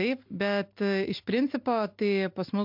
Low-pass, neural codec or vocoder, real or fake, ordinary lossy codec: 5.4 kHz; none; real; MP3, 48 kbps